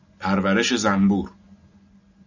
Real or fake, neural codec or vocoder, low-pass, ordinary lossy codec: real; none; 7.2 kHz; AAC, 48 kbps